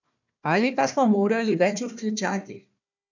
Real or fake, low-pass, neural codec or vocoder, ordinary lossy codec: fake; 7.2 kHz; codec, 16 kHz, 1 kbps, FunCodec, trained on Chinese and English, 50 frames a second; none